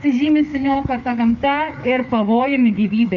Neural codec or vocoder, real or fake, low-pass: codec, 16 kHz, 4 kbps, FreqCodec, larger model; fake; 7.2 kHz